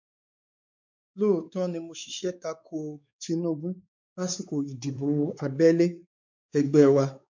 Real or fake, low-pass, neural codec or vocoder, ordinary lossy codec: fake; 7.2 kHz; codec, 16 kHz, 4 kbps, X-Codec, WavLM features, trained on Multilingual LibriSpeech; MP3, 64 kbps